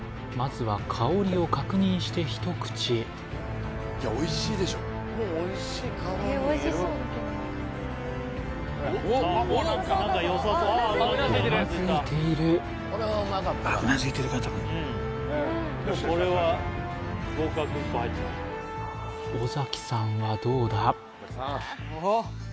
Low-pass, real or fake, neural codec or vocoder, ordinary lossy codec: none; real; none; none